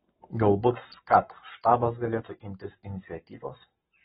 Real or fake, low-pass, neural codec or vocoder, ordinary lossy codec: fake; 19.8 kHz; codec, 44.1 kHz, 7.8 kbps, Pupu-Codec; AAC, 16 kbps